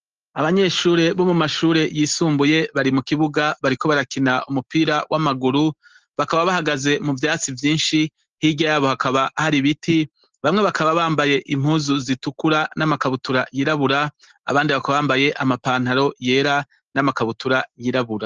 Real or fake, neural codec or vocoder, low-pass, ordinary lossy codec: real; none; 7.2 kHz; Opus, 16 kbps